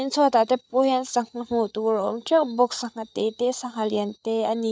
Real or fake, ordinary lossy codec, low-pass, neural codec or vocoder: fake; none; none; codec, 16 kHz, 8 kbps, FreqCodec, larger model